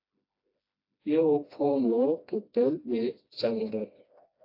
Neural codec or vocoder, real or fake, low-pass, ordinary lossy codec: codec, 16 kHz, 1 kbps, FreqCodec, smaller model; fake; 5.4 kHz; AAC, 32 kbps